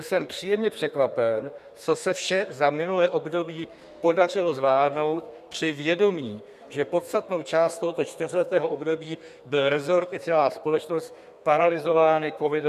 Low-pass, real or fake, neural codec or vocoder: 14.4 kHz; fake; codec, 32 kHz, 1.9 kbps, SNAC